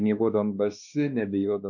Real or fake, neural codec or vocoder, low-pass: fake; codec, 16 kHz, 2 kbps, X-Codec, WavLM features, trained on Multilingual LibriSpeech; 7.2 kHz